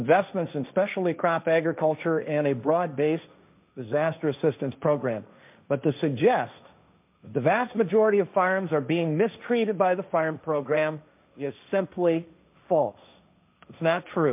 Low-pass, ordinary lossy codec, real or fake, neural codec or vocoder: 3.6 kHz; MP3, 32 kbps; fake; codec, 16 kHz, 1.1 kbps, Voila-Tokenizer